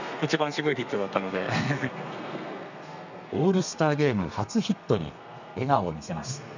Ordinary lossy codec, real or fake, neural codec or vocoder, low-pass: none; fake; codec, 32 kHz, 1.9 kbps, SNAC; 7.2 kHz